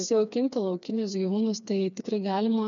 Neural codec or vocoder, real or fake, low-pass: codec, 16 kHz, 4 kbps, FreqCodec, smaller model; fake; 7.2 kHz